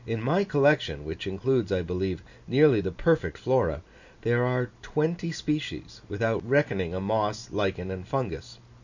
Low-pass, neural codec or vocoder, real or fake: 7.2 kHz; none; real